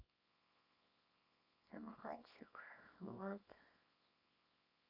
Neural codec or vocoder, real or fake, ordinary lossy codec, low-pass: codec, 24 kHz, 0.9 kbps, WavTokenizer, small release; fake; AAC, 32 kbps; 5.4 kHz